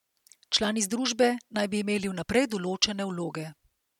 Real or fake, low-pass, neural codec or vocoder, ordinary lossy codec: real; 19.8 kHz; none; MP3, 96 kbps